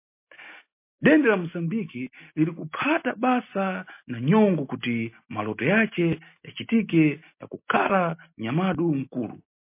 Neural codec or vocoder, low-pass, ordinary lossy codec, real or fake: none; 3.6 kHz; MP3, 24 kbps; real